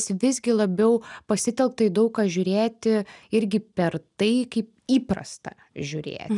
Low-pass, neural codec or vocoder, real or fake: 10.8 kHz; none; real